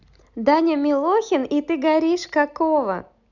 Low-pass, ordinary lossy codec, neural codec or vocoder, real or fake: 7.2 kHz; none; none; real